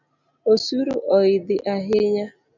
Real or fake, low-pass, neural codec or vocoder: real; 7.2 kHz; none